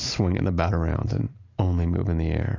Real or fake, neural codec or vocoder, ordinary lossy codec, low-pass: real; none; AAC, 32 kbps; 7.2 kHz